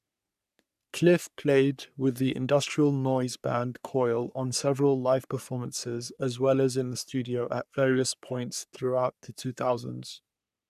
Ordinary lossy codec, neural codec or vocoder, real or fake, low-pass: none; codec, 44.1 kHz, 3.4 kbps, Pupu-Codec; fake; 14.4 kHz